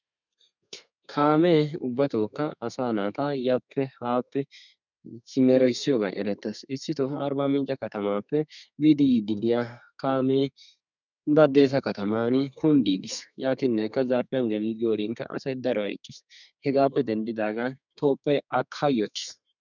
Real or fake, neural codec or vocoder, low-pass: fake; codec, 32 kHz, 1.9 kbps, SNAC; 7.2 kHz